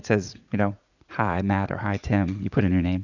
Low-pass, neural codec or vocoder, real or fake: 7.2 kHz; vocoder, 44.1 kHz, 80 mel bands, Vocos; fake